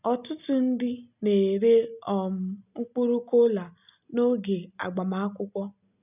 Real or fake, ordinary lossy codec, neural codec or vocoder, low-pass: real; none; none; 3.6 kHz